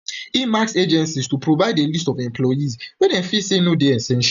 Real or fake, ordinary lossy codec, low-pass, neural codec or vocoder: real; none; 7.2 kHz; none